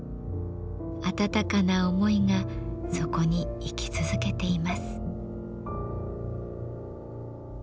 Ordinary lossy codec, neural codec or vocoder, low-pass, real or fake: none; none; none; real